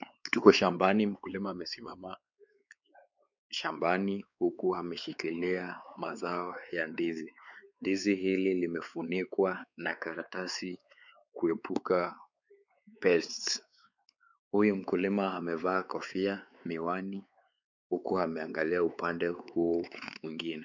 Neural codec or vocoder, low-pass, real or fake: codec, 16 kHz, 4 kbps, X-Codec, WavLM features, trained on Multilingual LibriSpeech; 7.2 kHz; fake